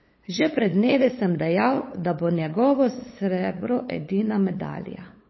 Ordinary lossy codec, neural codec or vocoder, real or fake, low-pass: MP3, 24 kbps; codec, 16 kHz, 8 kbps, FunCodec, trained on LibriTTS, 25 frames a second; fake; 7.2 kHz